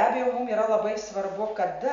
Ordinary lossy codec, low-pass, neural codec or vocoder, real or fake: MP3, 64 kbps; 7.2 kHz; none; real